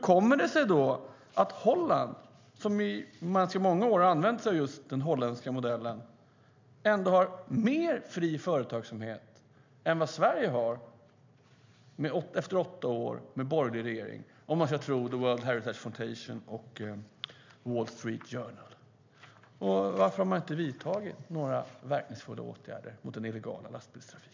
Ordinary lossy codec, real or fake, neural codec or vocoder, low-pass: MP3, 64 kbps; real; none; 7.2 kHz